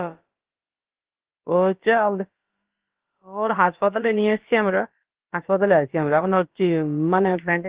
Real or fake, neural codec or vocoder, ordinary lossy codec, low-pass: fake; codec, 16 kHz, about 1 kbps, DyCAST, with the encoder's durations; Opus, 16 kbps; 3.6 kHz